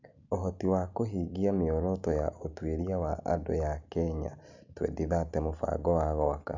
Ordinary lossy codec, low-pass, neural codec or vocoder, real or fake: none; 7.2 kHz; none; real